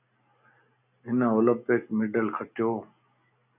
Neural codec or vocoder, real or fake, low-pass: none; real; 3.6 kHz